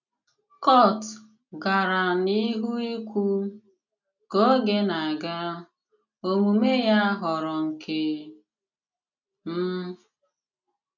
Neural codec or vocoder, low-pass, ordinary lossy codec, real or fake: none; 7.2 kHz; none; real